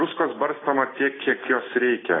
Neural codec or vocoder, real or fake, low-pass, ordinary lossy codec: none; real; 7.2 kHz; AAC, 16 kbps